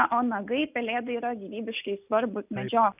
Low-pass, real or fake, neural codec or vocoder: 3.6 kHz; real; none